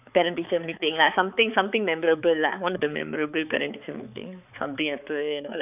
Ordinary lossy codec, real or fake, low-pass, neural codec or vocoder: none; fake; 3.6 kHz; codec, 16 kHz, 4 kbps, X-Codec, HuBERT features, trained on balanced general audio